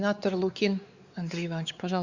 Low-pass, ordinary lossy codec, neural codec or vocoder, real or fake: 7.2 kHz; Opus, 64 kbps; codec, 16 kHz, 4 kbps, X-Codec, WavLM features, trained on Multilingual LibriSpeech; fake